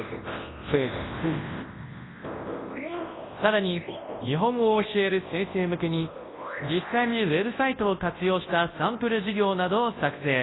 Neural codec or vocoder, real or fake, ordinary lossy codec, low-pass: codec, 24 kHz, 0.9 kbps, WavTokenizer, large speech release; fake; AAC, 16 kbps; 7.2 kHz